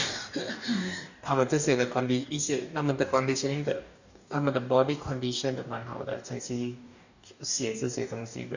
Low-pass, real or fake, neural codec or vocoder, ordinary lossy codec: 7.2 kHz; fake; codec, 44.1 kHz, 2.6 kbps, DAC; none